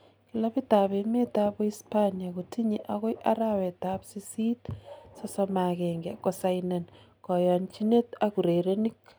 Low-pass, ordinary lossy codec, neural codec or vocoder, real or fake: none; none; none; real